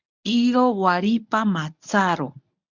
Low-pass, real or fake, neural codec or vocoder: 7.2 kHz; fake; codec, 24 kHz, 0.9 kbps, WavTokenizer, medium speech release version 1